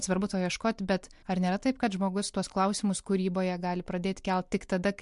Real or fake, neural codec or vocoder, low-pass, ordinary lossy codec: real; none; 10.8 kHz; MP3, 64 kbps